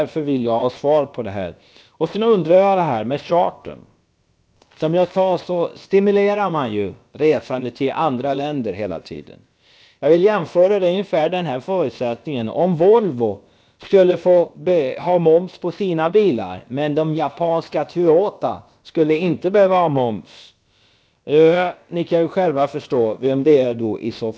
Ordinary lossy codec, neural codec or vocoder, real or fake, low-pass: none; codec, 16 kHz, about 1 kbps, DyCAST, with the encoder's durations; fake; none